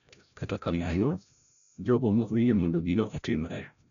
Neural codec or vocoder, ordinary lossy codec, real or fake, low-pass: codec, 16 kHz, 0.5 kbps, FreqCodec, larger model; none; fake; 7.2 kHz